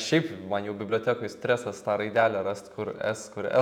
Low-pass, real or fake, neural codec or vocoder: 19.8 kHz; fake; vocoder, 48 kHz, 128 mel bands, Vocos